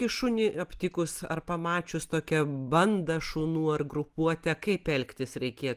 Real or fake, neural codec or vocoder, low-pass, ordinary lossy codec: real; none; 14.4 kHz; Opus, 24 kbps